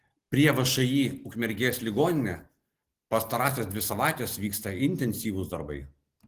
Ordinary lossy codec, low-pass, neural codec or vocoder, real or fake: Opus, 16 kbps; 14.4 kHz; none; real